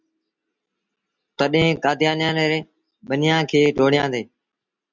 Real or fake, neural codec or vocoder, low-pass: real; none; 7.2 kHz